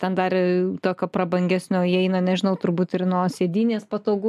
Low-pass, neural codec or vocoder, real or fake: 14.4 kHz; none; real